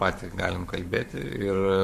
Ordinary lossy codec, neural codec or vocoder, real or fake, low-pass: MP3, 64 kbps; codec, 44.1 kHz, 7.8 kbps, Pupu-Codec; fake; 14.4 kHz